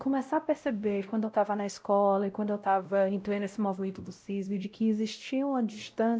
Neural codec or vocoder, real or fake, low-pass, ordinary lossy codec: codec, 16 kHz, 0.5 kbps, X-Codec, WavLM features, trained on Multilingual LibriSpeech; fake; none; none